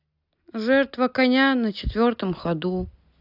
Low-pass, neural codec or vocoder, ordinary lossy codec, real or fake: 5.4 kHz; none; AAC, 48 kbps; real